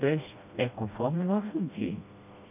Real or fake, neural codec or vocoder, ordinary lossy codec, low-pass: fake; codec, 16 kHz, 1 kbps, FreqCodec, smaller model; AAC, 32 kbps; 3.6 kHz